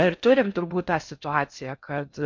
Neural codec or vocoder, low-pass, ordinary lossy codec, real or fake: codec, 16 kHz in and 24 kHz out, 0.6 kbps, FocalCodec, streaming, 4096 codes; 7.2 kHz; MP3, 64 kbps; fake